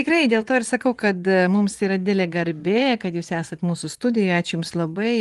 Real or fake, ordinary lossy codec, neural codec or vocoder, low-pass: fake; Opus, 24 kbps; vocoder, 24 kHz, 100 mel bands, Vocos; 10.8 kHz